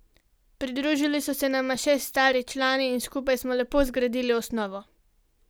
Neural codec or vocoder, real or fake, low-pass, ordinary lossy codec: none; real; none; none